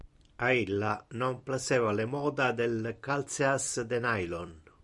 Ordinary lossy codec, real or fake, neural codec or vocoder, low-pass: Opus, 64 kbps; fake; vocoder, 44.1 kHz, 128 mel bands every 256 samples, BigVGAN v2; 10.8 kHz